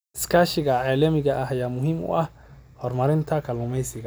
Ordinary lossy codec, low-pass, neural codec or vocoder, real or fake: none; none; none; real